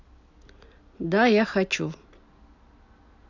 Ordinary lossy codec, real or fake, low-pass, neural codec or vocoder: none; real; 7.2 kHz; none